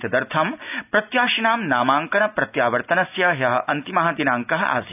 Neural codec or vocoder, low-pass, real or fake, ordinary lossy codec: none; 3.6 kHz; real; none